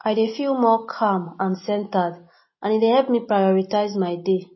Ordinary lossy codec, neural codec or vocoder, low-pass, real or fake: MP3, 24 kbps; none; 7.2 kHz; real